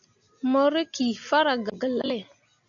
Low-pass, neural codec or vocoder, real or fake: 7.2 kHz; none; real